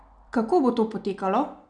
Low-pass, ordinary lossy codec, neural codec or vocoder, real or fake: 9.9 kHz; none; none; real